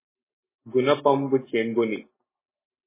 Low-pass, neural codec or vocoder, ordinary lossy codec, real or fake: 3.6 kHz; none; MP3, 16 kbps; real